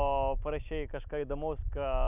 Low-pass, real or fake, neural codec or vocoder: 3.6 kHz; real; none